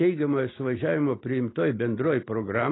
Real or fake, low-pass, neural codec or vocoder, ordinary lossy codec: real; 7.2 kHz; none; AAC, 16 kbps